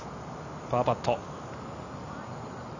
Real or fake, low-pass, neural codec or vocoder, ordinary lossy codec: real; 7.2 kHz; none; none